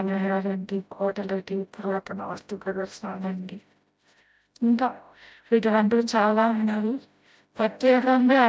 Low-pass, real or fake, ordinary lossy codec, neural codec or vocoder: none; fake; none; codec, 16 kHz, 0.5 kbps, FreqCodec, smaller model